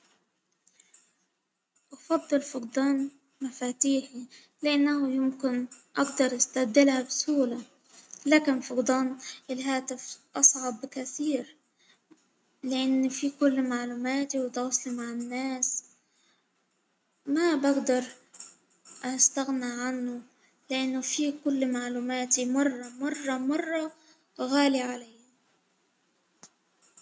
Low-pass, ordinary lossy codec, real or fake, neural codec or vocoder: none; none; real; none